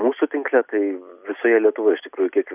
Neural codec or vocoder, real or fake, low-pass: none; real; 3.6 kHz